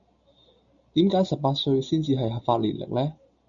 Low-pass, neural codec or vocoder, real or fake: 7.2 kHz; none; real